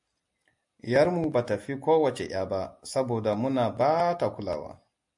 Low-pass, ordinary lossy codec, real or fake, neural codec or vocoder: 10.8 kHz; MP3, 64 kbps; real; none